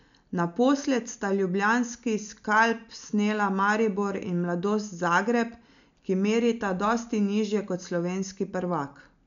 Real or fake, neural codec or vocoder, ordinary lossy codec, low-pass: real; none; none; 7.2 kHz